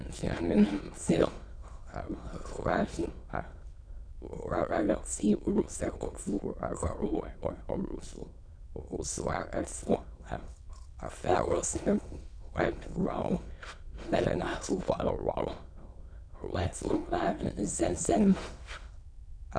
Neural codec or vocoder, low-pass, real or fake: autoencoder, 22.05 kHz, a latent of 192 numbers a frame, VITS, trained on many speakers; 9.9 kHz; fake